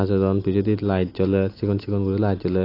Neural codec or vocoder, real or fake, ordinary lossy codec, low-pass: none; real; AAC, 32 kbps; 5.4 kHz